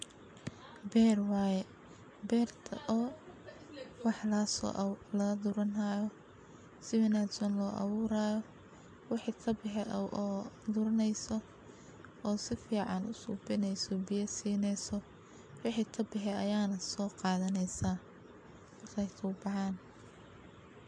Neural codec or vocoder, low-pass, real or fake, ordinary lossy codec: none; 9.9 kHz; real; MP3, 64 kbps